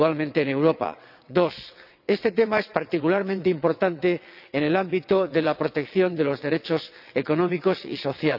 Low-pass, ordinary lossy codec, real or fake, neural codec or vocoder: 5.4 kHz; none; fake; vocoder, 22.05 kHz, 80 mel bands, WaveNeXt